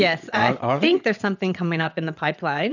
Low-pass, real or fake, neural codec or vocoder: 7.2 kHz; fake; vocoder, 22.05 kHz, 80 mel bands, WaveNeXt